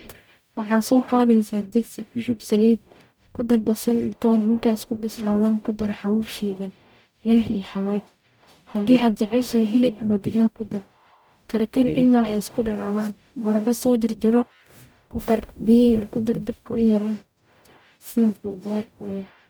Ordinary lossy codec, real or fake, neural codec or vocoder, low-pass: none; fake; codec, 44.1 kHz, 0.9 kbps, DAC; none